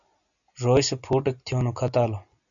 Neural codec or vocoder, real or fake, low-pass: none; real; 7.2 kHz